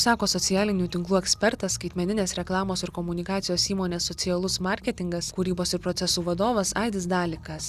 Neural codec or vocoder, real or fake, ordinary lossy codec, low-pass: none; real; Opus, 64 kbps; 14.4 kHz